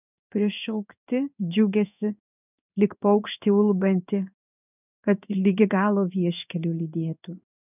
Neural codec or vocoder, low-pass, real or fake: codec, 16 kHz in and 24 kHz out, 1 kbps, XY-Tokenizer; 3.6 kHz; fake